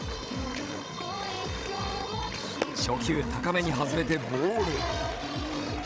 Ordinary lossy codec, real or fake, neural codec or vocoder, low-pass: none; fake; codec, 16 kHz, 16 kbps, FreqCodec, larger model; none